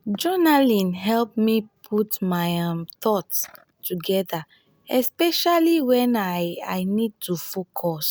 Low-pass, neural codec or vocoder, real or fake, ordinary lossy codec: none; none; real; none